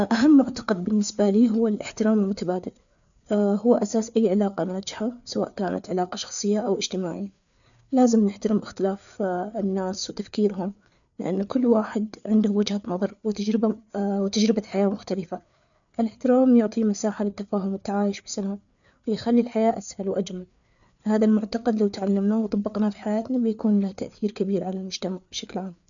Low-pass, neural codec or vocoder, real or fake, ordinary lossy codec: 7.2 kHz; codec, 16 kHz, 4 kbps, FreqCodec, larger model; fake; none